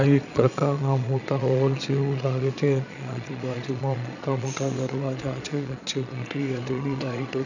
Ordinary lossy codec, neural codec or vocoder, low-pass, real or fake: none; vocoder, 22.05 kHz, 80 mel bands, Vocos; 7.2 kHz; fake